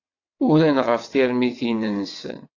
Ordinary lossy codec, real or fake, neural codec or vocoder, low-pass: AAC, 48 kbps; fake; vocoder, 22.05 kHz, 80 mel bands, WaveNeXt; 7.2 kHz